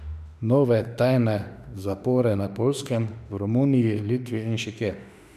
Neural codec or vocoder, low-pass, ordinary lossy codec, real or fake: autoencoder, 48 kHz, 32 numbers a frame, DAC-VAE, trained on Japanese speech; 14.4 kHz; none; fake